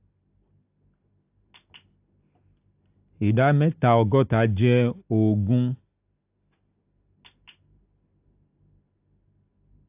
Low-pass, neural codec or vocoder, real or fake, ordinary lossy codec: 3.6 kHz; none; real; AAC, 32 kbps